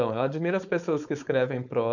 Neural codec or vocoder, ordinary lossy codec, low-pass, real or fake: codec, 16 kHz, 4.8 kbps, FACodec; none; 7.2 kHz; fake